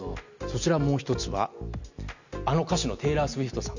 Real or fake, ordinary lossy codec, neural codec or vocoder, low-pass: real; none; none; 7.2 kHz